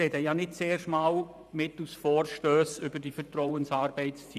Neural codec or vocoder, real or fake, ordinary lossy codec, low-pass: vocoder, 44.1 kHz, 128 mel bands every 512 samples, BigVGAN v2; fake; none; 14.4 kHz